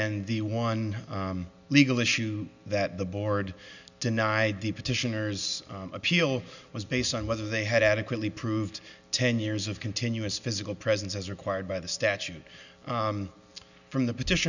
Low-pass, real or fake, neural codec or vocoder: 7.2 kHz; real; none